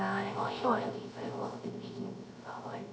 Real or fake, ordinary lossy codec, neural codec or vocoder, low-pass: fake; none; codec, 16 kHz, 0.3 kbps, FocalCodec; none